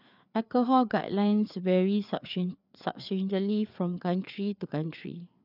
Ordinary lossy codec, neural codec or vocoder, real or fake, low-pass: none; codec, 16 kHz, 4 kbps, FreqCodec, larger model; fake; 5.4 kHz